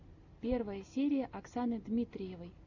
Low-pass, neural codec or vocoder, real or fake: 7.2 kHz; none; real